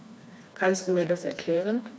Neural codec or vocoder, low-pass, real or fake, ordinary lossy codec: codec, 16 kHz, 2 kbps, FreqCodec, smaller model; none; fake; none